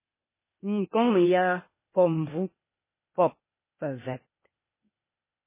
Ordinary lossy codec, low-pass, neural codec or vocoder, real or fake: MP3, 16 kbps; 3.6 kHz; codec, 16 kHz, 0.8 kbps, ZipCodec; fake